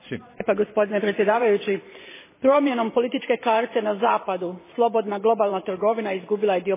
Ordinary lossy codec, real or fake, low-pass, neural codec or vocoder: MP3, 16 kbps; fake; 3.6 kHz; vocoder, 44.1 kHz, 128 mel bands every 512 samples, BigVGAN v2